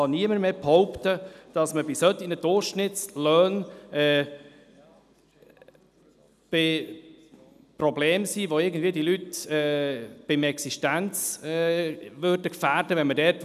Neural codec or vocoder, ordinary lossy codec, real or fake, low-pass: none; none; real; 14.4 kHz